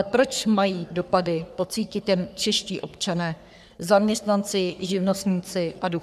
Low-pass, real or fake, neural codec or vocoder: 14.4 kHz; fake; codec, 44.1 kHz, 3.4 kbps, Pupu-Codec